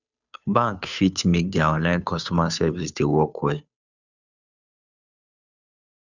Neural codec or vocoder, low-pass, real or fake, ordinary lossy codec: codec, 16 kHz, 2 kbps, FunCodec, trained on Chinese and English, 25 frames a second; 7.2 kHz; fake; none